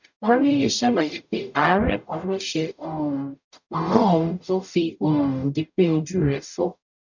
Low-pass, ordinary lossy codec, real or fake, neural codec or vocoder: 7.2 kHz; none; fake; codec, 44.1 kHz, 0.9 kbps, DAC